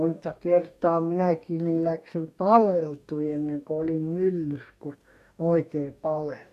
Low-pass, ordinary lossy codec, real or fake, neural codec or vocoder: 14.4 kHz; none; fake; codec, 44.1 kHz, 2.6 kbps, DAC